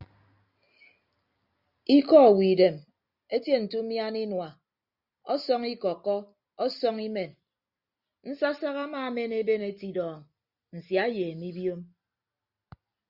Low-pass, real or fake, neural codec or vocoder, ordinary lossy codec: 5.4 kHz; real; none; Opus, 64 kbps